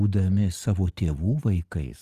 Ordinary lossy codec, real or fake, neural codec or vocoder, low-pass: Opus, 24 kbps; real; none; 14.4 kHz